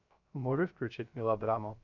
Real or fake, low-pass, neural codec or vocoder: fake; 7.2 kHz; codec, 16 kHz, 0.3 kbps, FocalCodec